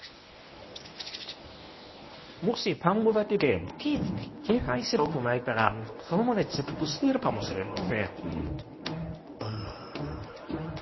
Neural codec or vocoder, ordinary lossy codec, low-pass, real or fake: codec, 24 kHz, 0.9 kbps, WavTokenizer, medium speech release version 1; MP3, 24 kbps; 7.2 kHz; fake